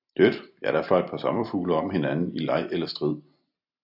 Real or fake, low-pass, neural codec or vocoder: real; 5.4 kHz; none